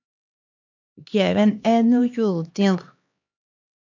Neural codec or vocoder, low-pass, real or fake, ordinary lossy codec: codec, 16 kHz, 2 kbps, X-Codec, HuBERT features, trained on LibriSpeech; 7.2 kHz; fake; AAC, 48 kbps